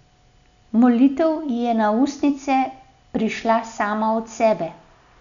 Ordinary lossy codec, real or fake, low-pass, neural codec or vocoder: none; real; 7.2 kHz; none